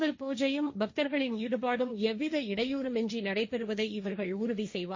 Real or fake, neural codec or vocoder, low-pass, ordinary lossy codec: fake; codec, 16 kHz, 1.1 kbps, Voila-Tokenizer; 7.2 kHz; MP3, 32 kbps